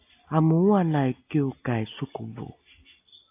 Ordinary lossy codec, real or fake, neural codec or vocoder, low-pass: AAC, 24 kbps; real; none; 3.6 kHz